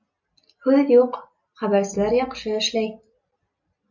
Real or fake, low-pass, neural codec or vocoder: real; 7.2 kHz; none